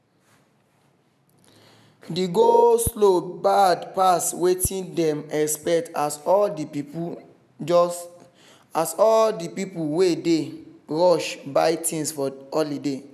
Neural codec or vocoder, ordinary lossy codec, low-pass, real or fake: none; none; 14.4 kHz; real